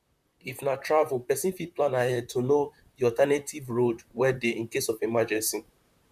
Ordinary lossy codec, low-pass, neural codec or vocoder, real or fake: none; 14.4 kHz; vocoder, 44.1 kHz, 128 mel bands, Pupu-Vocoder; fake